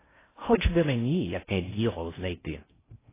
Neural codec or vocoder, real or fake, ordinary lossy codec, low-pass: codec, 16 kHz in and 24 kHz out, 0.6 kbps, FocalCodec, streaming, 4096 codes; fake; AAC, 16 kbps; 3.6 kHz